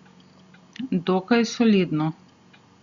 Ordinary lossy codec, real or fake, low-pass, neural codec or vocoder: Opus, 64 kbps; real; 7.2 kHz; none